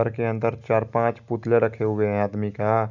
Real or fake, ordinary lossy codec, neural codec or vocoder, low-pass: real; none; none; 7.2 kHz